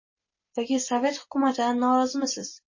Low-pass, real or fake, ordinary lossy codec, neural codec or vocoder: 7.2 kHz; real; MP3, 32 kbps; none